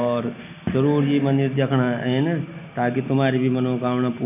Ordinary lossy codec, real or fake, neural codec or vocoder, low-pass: none; real; none; 3.6 kHz